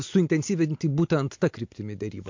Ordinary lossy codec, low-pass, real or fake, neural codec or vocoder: MP3, 48 kbps; 7.2 kHz; real; none